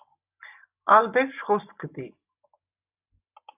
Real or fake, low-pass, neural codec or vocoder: real; 3.6 kHz; none